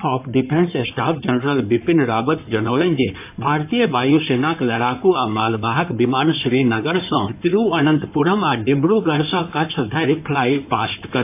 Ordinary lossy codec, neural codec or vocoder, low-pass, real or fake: AAC, 32 kbps; codec, 16 kHz in and 24 kHz out, 2.2 kbps, FireRedTTS-2 codec; 3.6 kHz; fake